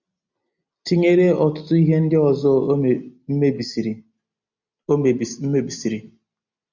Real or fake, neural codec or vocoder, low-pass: real; none; 7.2 kHz